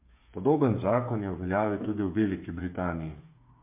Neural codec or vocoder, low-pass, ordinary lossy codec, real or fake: codec, 16 kHz, 8 kbps, FreqCodec, smaller model; 3.6 kHz; MP3, 32 kbps; fake